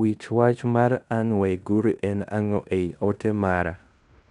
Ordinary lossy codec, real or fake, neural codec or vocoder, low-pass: none; fake; codec, 16 kHz in and 24 kHz out, 0.9 kbps, LongCat-Audio-Codec, fine tuned four codebook decoder; 10.8 kHz